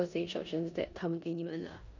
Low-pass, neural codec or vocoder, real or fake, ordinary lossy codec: 7.2 kHz; codec, 16 kHz in and 24 kHz out, 0.9 kbps, LongCat-Audio-Codec, four codebook decoder; fake; none